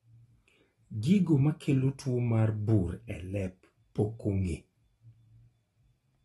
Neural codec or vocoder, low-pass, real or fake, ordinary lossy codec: none; 19.8 kHz; real; AAC, 32 kbps